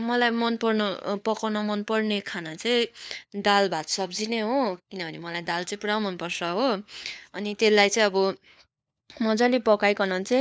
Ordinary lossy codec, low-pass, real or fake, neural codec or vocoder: none; none; fake; codec, 16 kHz, 4 kbps, FunCodec, trained on LibriTTS, 50 frames a second